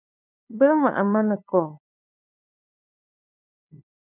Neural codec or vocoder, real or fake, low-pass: codec, 16 kHz, 2 kbps, FunCodec, trained on LibriTTS, 25 frames a second; fake; 3.6 kHz